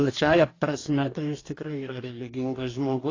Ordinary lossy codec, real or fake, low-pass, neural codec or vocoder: AAC, 32 kbps; fake; 7.2 kHz; codec, 44.1 kHz, 2.6 kbps, DAC